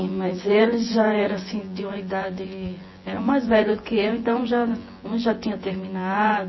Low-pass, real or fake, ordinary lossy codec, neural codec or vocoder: 7.2 kHz; fake; MP3, 24 kbps; vocoder, 24 kHz, 100 mel bands, Vocos